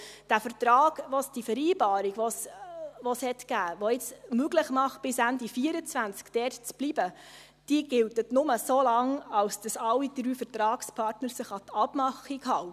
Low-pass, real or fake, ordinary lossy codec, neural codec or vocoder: 14.4 kHz; real; none; none